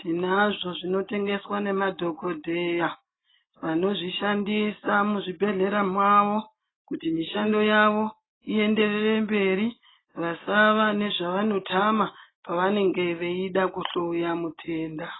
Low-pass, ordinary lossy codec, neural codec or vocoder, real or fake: 7.2 kHz; AAC, 16 kbps; none; real